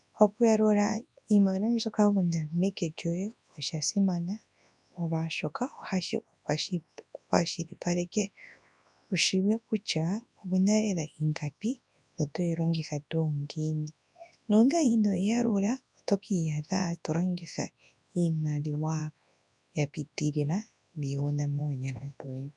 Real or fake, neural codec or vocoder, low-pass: fake; codec, 24 kHz, 0.9 kbps, WavTokenizer, large speech release; 10.8 kHz